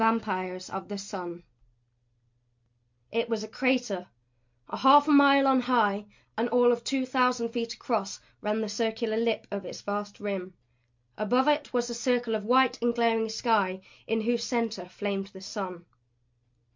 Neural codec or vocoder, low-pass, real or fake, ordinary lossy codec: none; 7.2 kHz; real; MP3, 64 kbps